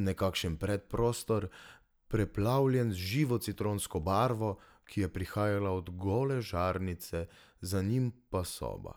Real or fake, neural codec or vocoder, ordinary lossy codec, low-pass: real; none; none; none